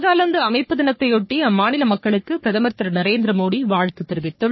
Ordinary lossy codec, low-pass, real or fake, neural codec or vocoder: MP3, 24 kbps; 7.2 kHz; fake; codec, 44.1 kHz, 3.4 kbps, Pupu-Codec